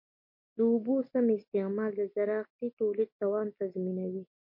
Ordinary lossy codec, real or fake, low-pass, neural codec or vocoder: MP3, 32 kbps; real; 5.4 kHz; none